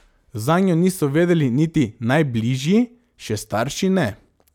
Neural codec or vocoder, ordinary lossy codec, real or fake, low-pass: none; none; real; 19.8 kHz